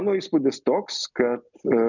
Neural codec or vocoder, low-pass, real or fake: none; 7.2 kHz; real